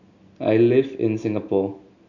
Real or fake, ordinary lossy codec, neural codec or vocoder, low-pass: real; none; none; 7.2 kHz